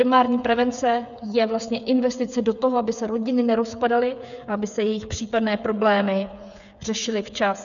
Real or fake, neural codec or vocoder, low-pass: fake; codec, 16 kHz, 8 kbps, FreqCodec, smaller model; 7.2 kHz